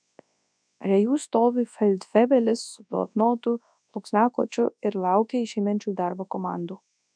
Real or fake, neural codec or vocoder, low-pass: fake; codec, 24 kHz, 0.9 kbps, WavTokenizer, large speech release; 9.9 kHz